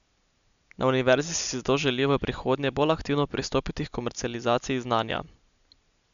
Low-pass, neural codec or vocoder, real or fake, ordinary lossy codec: 7.2 kHz; none; real; none